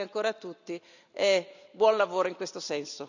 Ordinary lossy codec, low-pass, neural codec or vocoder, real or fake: none; 7.2 kHz; none; real